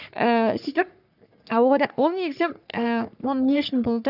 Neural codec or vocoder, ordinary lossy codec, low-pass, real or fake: codec, 44.1 kHz, 3.4 kbps, Pupu-Codec; none; 5.4 kHz; fake